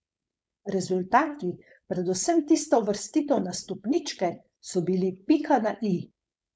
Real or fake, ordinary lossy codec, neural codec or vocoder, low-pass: fake; none; codec, 16 kHz, 4.8 kbps, FACodec; none